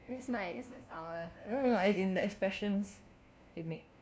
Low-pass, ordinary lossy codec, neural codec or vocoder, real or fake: none; none; codec, 16 kHz, 1 kbps, FunCodec, trained on LibriTTS, 50 frames a second; fake